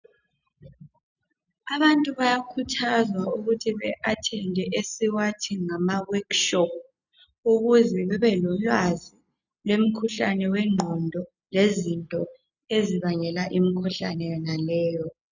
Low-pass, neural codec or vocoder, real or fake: 7.2 kHz; none; real